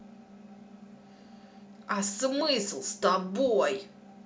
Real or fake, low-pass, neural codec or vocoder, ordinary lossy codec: real; none; none; none